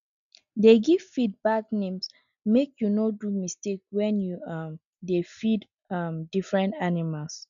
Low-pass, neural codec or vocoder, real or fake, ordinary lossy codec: 7.2 kHz; none; real; none